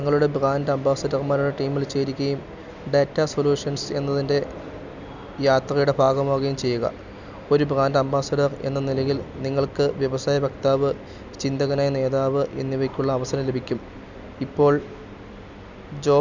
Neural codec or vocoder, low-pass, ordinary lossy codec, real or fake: none; 7.2 kHz; none; real